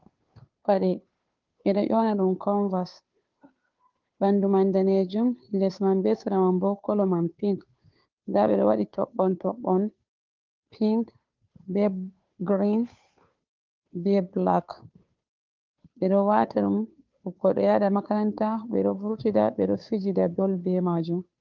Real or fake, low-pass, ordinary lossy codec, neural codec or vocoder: fake; 7.2 kHz; Opus, 32 kbps; codec, 16 kHz, 2 kbps, FunCodec, trained on Chinese and English, 25 frames a second